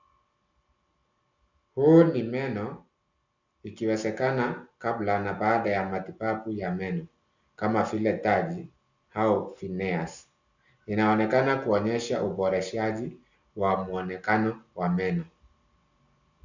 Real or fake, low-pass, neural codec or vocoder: real; 7.2 kHz; none